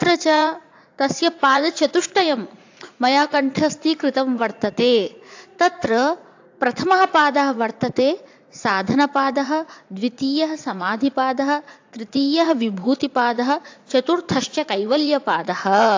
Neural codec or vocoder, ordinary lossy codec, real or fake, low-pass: vocoder, 44.1 kHz, 80 mel bands, Vocos; AAC, 48 kbps; fake; 7.2 kHz